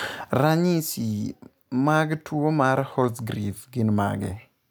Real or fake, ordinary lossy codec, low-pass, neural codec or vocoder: real; none; none; none